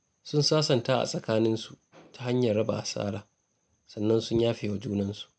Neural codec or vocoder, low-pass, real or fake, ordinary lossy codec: none; 9.9 kHz; real; none